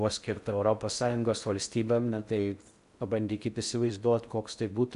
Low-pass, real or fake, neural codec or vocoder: 10.8 kHz; fake; codec, 16 kHz in and 24 kHz out, 0.6 kbps, FocalCodec, streaming, 4096 codes